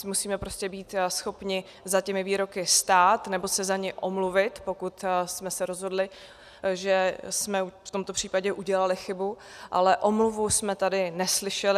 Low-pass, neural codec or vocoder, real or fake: 14.4 kHz; none; real